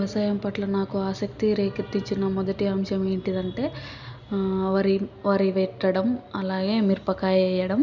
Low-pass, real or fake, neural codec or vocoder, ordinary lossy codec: 7.2 kHz; real; none; none